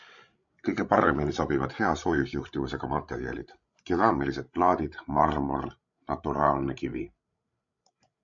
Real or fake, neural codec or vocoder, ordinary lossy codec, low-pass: fake; codec, 16 kHz, 16 kbps, FreqCodec, larger model; MP3, 64 kbps; 7.2 kHz